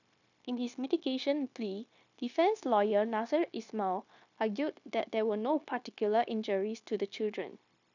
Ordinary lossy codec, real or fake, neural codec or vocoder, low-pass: none; fake; codec, 16 kHz, 0.9 kbps, LongCat-Audio-Codec; 7.2 kHz